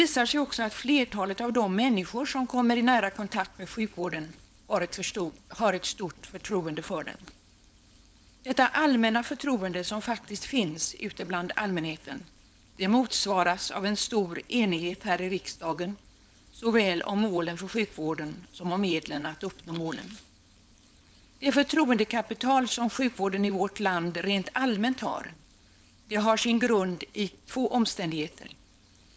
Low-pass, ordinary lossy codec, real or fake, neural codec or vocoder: none; none; fake; codec, 16 kHz, 4.8 kbps, FACodec